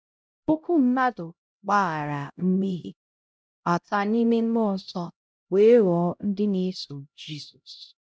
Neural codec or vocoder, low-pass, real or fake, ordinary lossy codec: codec, 16 kHz, 0.5 kbps, X-Codec, HuBERT features, trained on LibriSpeech; none; fake; none